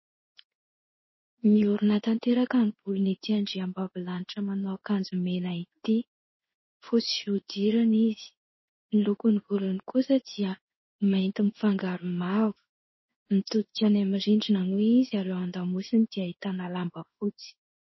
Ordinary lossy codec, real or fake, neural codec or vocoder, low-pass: MP3, 24 kbps; fake; codec, 16 kHz in and 24 kHz out, 1 kbps, XY-Tokenizer; 7.2 kHz